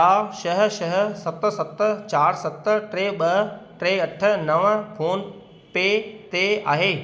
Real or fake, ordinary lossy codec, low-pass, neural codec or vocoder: real; none; none; none